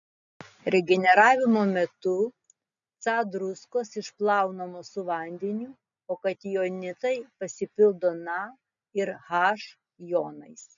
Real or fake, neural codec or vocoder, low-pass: real; none; 7.2 kHz